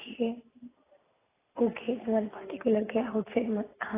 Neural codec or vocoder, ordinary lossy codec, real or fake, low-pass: none; AAC, 16 kbps; real; 3.6 kHz